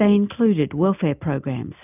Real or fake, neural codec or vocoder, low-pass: real; none; 3.6 kHz